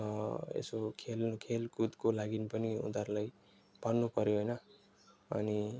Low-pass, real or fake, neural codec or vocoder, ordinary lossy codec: none; real; none; none